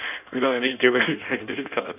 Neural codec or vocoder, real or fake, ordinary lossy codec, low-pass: codec, 16 kHz in and 24 kHz out, 1.1 kbps, FireRedTTS-2 codec; fake; none; 3.6 kHz